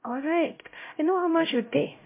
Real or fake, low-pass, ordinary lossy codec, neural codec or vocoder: fake; 3.6 kHz; MP3, 24 kbps; codec, 16 kHz, 0.5 kbps, X-Codec, HuBERT features, trained on LibriSpeech